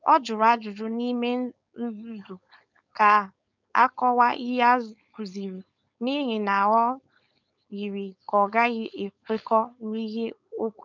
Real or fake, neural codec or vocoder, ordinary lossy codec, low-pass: fake; codec, 16 kHz, 4.8 kbps, FACodec; none; 7.2 kHz